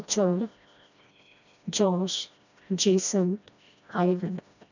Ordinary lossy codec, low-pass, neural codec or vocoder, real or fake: none; 7.2 kHz; codec, 16 kHz, 1 kbps, FreqCodec, smaller model; fake